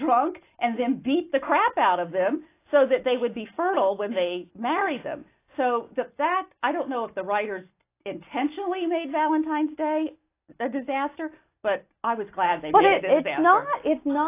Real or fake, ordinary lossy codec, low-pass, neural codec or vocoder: real; AAC, 24 kbps; 3.6 kHz; none